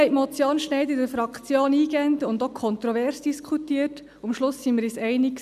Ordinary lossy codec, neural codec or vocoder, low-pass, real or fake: none; none; 14.4 kHz; real